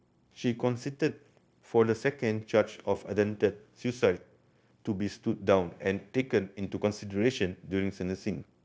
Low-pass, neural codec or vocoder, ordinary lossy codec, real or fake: none; codec, 16 kHz, 0.9 kbps, LongCat-Audio-Codec; none; fake